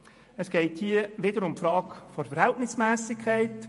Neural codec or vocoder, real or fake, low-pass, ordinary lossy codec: vocoder, 48 kHz, 128 mel bands, Vocos; fake; 14.4 kHz; MP3, 48 kbps